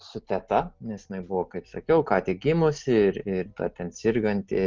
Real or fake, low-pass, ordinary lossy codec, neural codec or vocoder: real; 7.2 kHz; Opus, 32 kbps; none